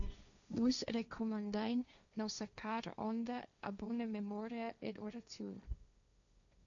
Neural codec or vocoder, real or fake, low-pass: codec, 16 kHz, 1.1 kbps, Voila-Tokenizer; fake; 7.2 kHz